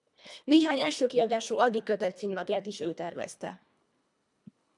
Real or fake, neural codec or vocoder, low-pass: fake; codec, 24 kHz, 1.5 kbps, HILCodec; 10.8 kHz